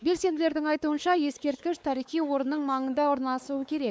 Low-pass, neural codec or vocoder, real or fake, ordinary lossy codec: none; codec, 16 kHz, 4 kbps, X-Codec, WavLM features, trained on Multilingual LibriSpeech; fake; none